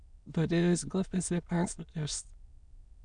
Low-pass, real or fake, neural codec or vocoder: 9.9 kHz; fake; autoencoder, 22.05 kHz, a latent of 192 numbers a frame, VITS, trained on many speakers